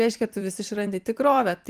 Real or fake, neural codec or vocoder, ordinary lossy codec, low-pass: fake; vocoder, 44.1 kHz, 128 mel bands every 256 samples, BigVGAN v2; Opus, 24 kbps; 14.4 kHz